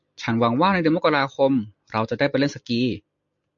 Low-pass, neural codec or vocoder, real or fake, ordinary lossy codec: 7.2 kHz; none; real; MP3, 48 kbps